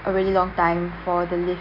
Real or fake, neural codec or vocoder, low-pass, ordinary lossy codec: real; none; 5.4 kHz; none